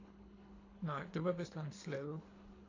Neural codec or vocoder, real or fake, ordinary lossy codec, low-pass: codec, 24 kHz, 3 kbps, HILCodec; fake; AAC, 32 kbps; 7.2 kHz